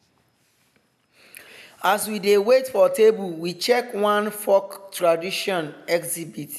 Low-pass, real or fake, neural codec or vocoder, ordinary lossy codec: 14.4 kHz; real; none; none